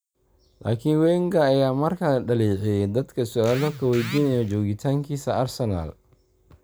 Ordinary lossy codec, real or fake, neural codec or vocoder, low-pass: none; real; none; none